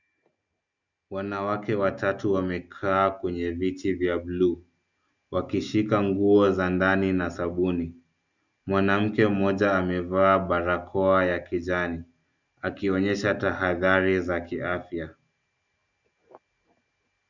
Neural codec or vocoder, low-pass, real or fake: none; 7.2 kHz; real